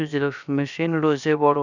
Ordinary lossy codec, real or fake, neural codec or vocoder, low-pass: none; fake; codec, 16 kHz, about 1 kbps, DyCAST, with the encoder's durations; 7.2 kHz